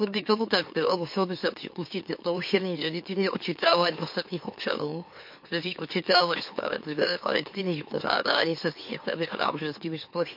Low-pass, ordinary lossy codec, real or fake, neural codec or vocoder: 5.4 kHz; MP3, 32 kbps; fake; autoencoder, 44.1 kHz, a latent of 192 numbers a frame, MeloTTS